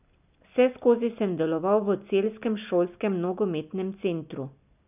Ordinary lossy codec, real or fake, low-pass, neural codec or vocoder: none; real; 3.6 kHz; none